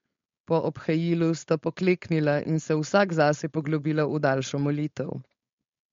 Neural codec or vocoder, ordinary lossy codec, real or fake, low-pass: codec, 16 kHz, 4.8 kbps, FACodec; MP3, 48 kbps; fake; 7.2 kHz